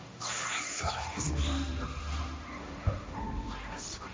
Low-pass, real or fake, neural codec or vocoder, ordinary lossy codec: none; fake; codec, 16 kHz, 1.1 kbps, Voila-Tokenizer; none